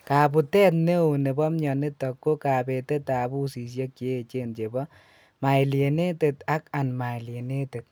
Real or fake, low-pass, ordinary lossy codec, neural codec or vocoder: real; none; none; none